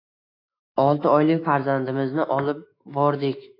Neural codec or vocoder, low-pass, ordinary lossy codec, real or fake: autoencoder, 48 kHz, 128 numbers a frame, DAC-VAE, trained on Japanese speech; 5.4 kHz; AAC, 32 kbps; fake